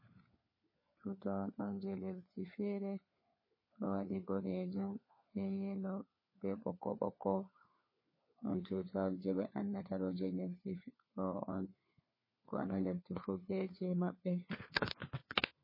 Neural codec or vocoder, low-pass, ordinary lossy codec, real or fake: codec, 16 kHz, 4 kbps, FunCodec, trained on LibriTTS, 50 frames a second; 5.4 kHz; MP3, 24 kbps; fake